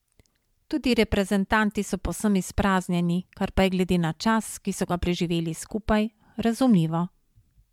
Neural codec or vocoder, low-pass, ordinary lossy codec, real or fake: vocoder, 44.1 kHz, 128 mel bands every 512 samples, BigVGAN v2; 19.8 kHz; MP3, 96 kbps; fake